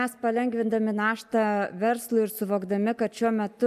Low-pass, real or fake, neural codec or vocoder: 14.4 kHz; real; none